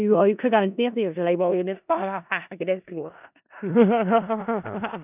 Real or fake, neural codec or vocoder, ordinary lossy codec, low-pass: fake; codec, 16 kHz in and 24 kHz out, 0.4 kbps, LongCat-Audio-Codec, four codebook decoder; none; 3.6 kHz